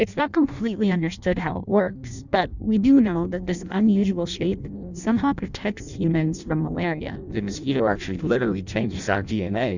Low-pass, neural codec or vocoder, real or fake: 7.2 kHz; codec, 16 kHz in and 24 kHz out, 0.6 kbps, FireRedTTS-2 codec; fake